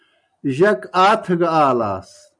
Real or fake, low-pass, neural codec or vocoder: real; 9.9 kHz; none